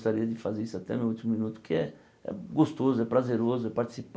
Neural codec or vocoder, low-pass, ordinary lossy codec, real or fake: none; none; none; real